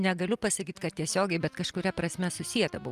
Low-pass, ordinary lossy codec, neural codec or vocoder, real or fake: 14.4 kHz; Opus, 32 kbps; none; real